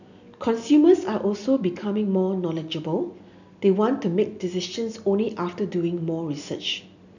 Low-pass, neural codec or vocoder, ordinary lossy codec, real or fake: 7.2 kHz; none; none; real